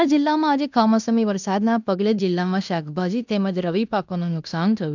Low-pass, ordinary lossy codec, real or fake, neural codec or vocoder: 7.2 kHz; none; fake; codec, 16 kHz in and 24 kHz out, 0.9 kbps, LongCat-Audio-Codec, fine tuned four codebook decoder